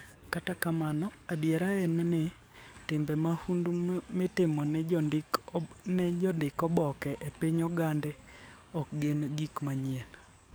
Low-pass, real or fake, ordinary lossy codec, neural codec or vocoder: none; fake; none; codec, 44.1 kHz, 7.8 kbps, DAC